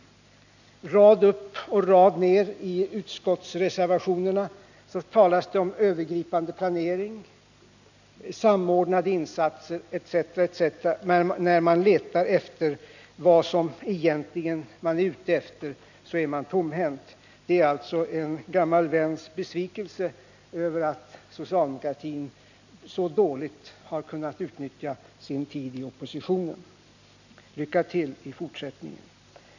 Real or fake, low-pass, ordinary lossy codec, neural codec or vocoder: real; 7.2 kHz; none; none